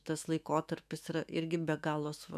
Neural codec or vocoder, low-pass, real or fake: autoencoder, 48 kHz, 128 numbers a frame, DAC-VAE, trained on Japanese speech; 14.4 kHz; fake